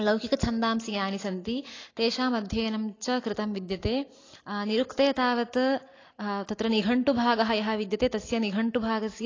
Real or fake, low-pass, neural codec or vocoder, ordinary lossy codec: real; 7.2 kHz; none; AAC, 32 kbps